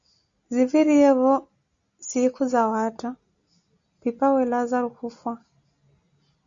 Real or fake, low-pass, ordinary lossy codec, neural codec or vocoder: real; 7.2 kHz; Opus, 64 kbps; none